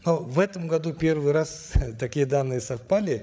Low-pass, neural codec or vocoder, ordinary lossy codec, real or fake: none; codec, 16 kHz, 8 kbps, FreqCodec, larger model; none; fake